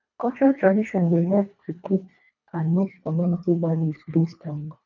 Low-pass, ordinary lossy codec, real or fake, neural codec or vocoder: 7.2 kHz; none; fake; codec, 24 kHz, 1.5 kbps, HILCodec